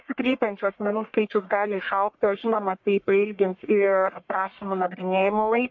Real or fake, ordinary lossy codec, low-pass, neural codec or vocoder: fake; MP3, 48 kbps; 7.2 kHz; codec, 44.1 kHz, 1.7 kbps, Pupu-Codec